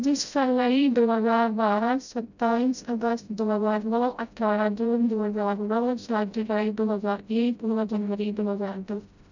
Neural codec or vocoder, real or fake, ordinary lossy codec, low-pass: codec, 16 kHz, 0.5 kbps, FreqCodec, smaller model; fake; none; 7.2 kHz